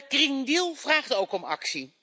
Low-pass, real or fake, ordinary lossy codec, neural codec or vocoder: none; real; none; none